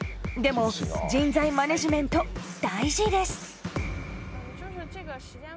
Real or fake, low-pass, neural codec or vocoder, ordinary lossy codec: real; none; none; none